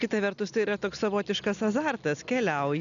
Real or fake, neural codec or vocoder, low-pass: real; none; 7.2 kHz